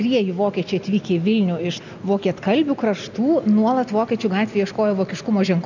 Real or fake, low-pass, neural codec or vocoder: real; 7.2 kHz; none